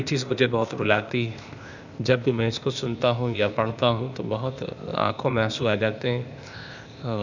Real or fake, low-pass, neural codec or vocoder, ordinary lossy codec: fake; 7.2 kHz; codec, 16 kHz, 0.8 kbps, ZipCodec; none